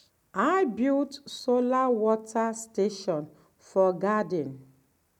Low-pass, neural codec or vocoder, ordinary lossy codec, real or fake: 19.8 kHz; none; none; real